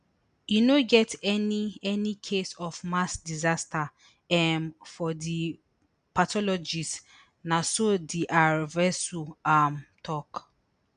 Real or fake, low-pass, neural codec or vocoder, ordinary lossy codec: real; 9.9 kHz; none; none